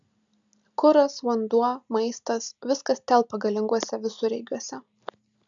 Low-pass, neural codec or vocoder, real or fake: 7.2 kHz; none; real